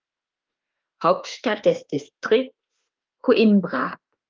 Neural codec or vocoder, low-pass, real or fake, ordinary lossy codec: autoencoder, 48 kHz, 32 numbers a frame, DAC-VAE, trained on Japanese speech; 7.2 kHz; fake; Opus, 32 kbps